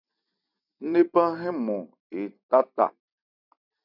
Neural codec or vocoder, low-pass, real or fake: vocoder, 44.1 kHz, 128 mel bands every 256 samples, BigVGAN v2; 5.4 kHz; fake